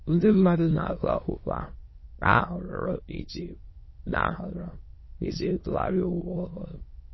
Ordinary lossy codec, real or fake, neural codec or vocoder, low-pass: MP3, 24 kbps; fake; autoencoder, 22.05 kHz, a latent of 192 numbers a frame, VITS, trained on many speakers; 7.2 kHz